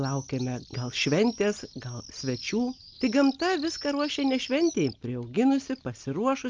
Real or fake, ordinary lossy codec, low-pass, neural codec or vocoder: real; Opus, 24 kbps; 7.2 kHz; none